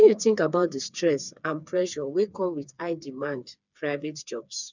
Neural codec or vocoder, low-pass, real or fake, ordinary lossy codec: codec, 16 kHz, 4 kbps, FreqCodec, smaller model; 7.2 kHz; fake; none